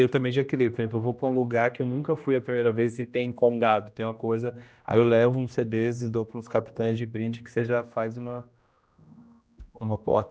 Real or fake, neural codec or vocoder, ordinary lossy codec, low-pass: fake; codec, 16 kHz, 1 kbps, X-Codec, HuBERT features, trained on general audio; none; none